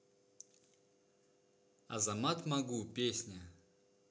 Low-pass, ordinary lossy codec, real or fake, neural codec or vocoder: none; none; real; none